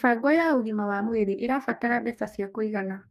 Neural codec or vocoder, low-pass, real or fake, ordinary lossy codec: codec, 44.1 kHz, 2.6 kbps, DAC; 14.4 kHz; fake; none